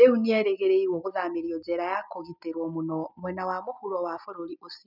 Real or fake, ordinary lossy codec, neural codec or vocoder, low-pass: real; none; none; 5.4 kHz